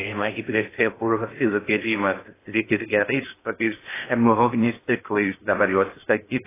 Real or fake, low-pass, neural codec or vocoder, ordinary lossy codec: fake; 3.6 kHz; codec, 16 kHz in and 24 kHz out, 0.6 kbps, FocalCodec, streaming, 2048 codes; AAC, 16 kbps